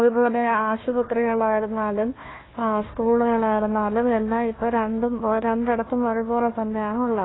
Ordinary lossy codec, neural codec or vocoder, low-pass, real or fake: AAC, 16 kbps; codec, 16 kHz, 1 kbps, FunCodec, trained on Chinese and English, 50 frames a second; 7.2 kHz; fake